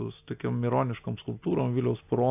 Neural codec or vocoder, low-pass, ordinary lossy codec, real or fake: none; 3.6 kHz; AAC, 32 kbps; real